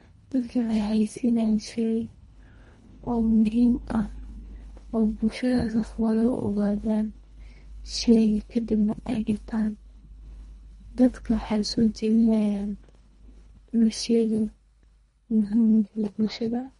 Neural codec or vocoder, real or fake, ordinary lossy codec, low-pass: codec, 24 kHz, 1.5 kbps, HILCodec; fake; MP3, 48 kbps; 10.8 kHz